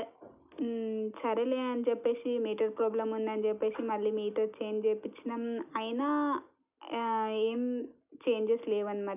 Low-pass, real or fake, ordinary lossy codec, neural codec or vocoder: 3.6 kHz; real; none; none